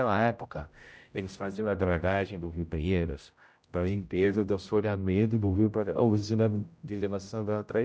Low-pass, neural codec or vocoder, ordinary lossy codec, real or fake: none; codec, 16 kHz, 0.5 kbps, X-Codec, HuBERT features, trained on general audio; none; fake